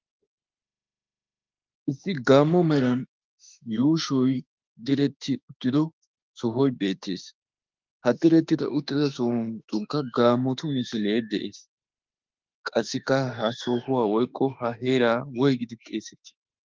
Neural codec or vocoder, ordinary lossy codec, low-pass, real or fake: autoencoder, 48 kHz, 32 numbers a frame, DAC-VAE, trained on Japanese speech; Opus, 32 kbps; 7.2 kHz; fake